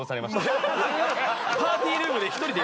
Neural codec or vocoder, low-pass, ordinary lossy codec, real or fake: none; none; none; real